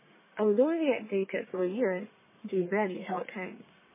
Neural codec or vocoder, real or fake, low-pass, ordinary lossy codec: codec, 44.1 kHz, 1.7 kbps, Pupu-Codec; fake; 3.6 kHz; MP3, 16 kbps